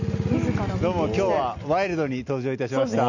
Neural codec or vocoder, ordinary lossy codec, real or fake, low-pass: none; none; real; 7.2 kHz